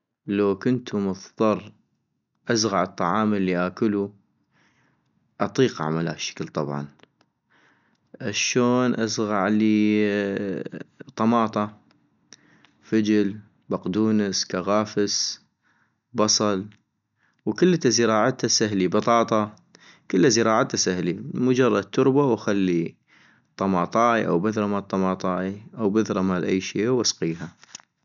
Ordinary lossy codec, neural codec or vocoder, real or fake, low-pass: none; none; real; 7.2 kHz